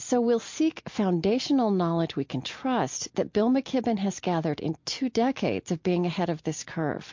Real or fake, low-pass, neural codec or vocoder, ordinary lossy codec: real; 7.2 kHz; none; MP3, 48 kbps